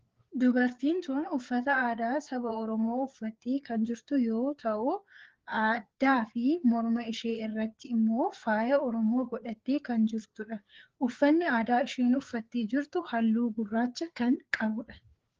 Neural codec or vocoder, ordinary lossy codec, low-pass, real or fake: codec, 16 kHz, 4 kbps, FreqCodec, larger model; Opus, 16 kbps; 7.2 kHz; fake